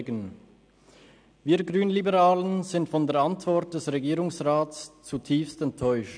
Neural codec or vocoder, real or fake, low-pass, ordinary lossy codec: none; real; 9.9 kHz; none